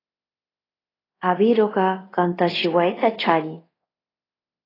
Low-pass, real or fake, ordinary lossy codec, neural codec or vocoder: 5.4 kHz; fake; AAC, 24 kbps; codec, 24 kHz, 0.5 kbps, DualCodec